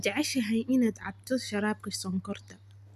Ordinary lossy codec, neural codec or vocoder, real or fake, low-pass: none; none; real; 14.4 kHz